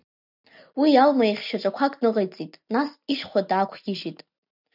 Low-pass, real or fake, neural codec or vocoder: 5.4 kHz; real; none